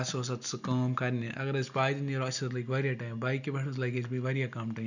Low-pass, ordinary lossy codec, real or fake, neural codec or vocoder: 7.2 kHz; none; real; none